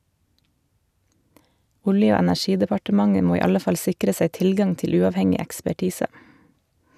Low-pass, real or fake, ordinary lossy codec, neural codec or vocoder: 14.4 kHz; real; none; none